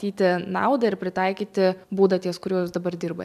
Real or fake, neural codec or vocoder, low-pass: fake; vocoder, 44.1 kHz, 128 mel bands every 256 samples, BigVGAN v2; 14.4 kHz